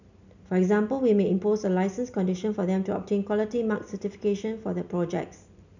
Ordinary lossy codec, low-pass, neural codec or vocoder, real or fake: none; 7.2 kHz; none; real